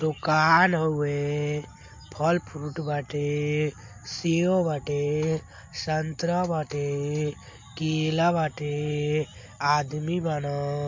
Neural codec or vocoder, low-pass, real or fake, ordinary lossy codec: none; 7.2 kHz; real; MP3, 48 kbps